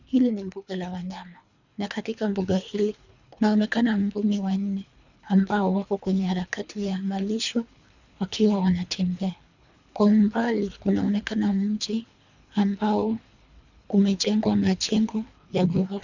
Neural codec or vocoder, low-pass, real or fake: codec, 24 kHz, 3 kbps, HILCodec; 7.2 kHz; fake